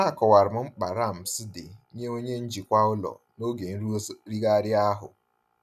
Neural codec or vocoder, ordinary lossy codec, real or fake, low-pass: none; none; real; 14.4 kHz